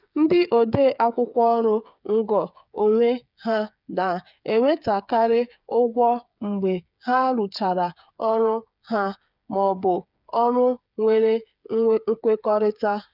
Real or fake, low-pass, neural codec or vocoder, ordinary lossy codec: fake; 5.4 kHz; codec, 16 kHz, 8 kbps, FreqCodec, smaller model; none